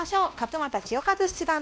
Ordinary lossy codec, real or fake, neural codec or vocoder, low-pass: none; fake; codec, 16 kHz, 1 kbps, X-Codec, WavLM features, trained on Multilingual LibriSpeech; none